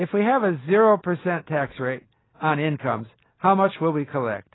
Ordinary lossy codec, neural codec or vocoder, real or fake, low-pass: AAC, 16 kbps; none; real; 7.2 kHz